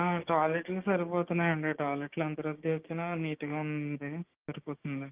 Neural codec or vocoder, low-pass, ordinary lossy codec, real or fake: codec, 16 kHz, 6 kbps, DAC; 3.6 kHz; Opus, 32 kbps; fake